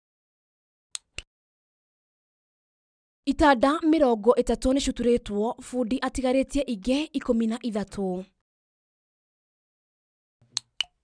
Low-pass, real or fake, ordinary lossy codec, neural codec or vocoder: 9.9 kHz; real; none; none